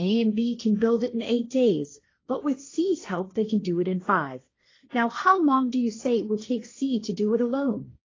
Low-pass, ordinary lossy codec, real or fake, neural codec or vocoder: 7.2 kHz; AAC, 32 kbps; fake; codec, 16 kHz, 1.1 kbps, Voila-Tokenizer